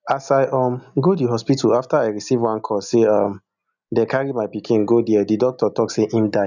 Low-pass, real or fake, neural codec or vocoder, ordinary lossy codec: 7.2 kHz; real; none; none